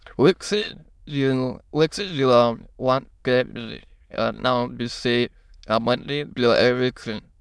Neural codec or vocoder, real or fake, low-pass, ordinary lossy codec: autoencoder, 22.05 kHz, a latent of 192 numbers a frame, VITS, trained on many speakers; fake; none; none